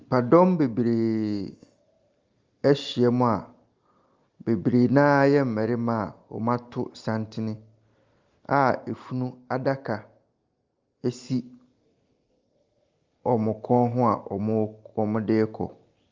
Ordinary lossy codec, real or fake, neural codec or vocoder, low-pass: Opus, 32 kbps; real; none; 7.2 kHz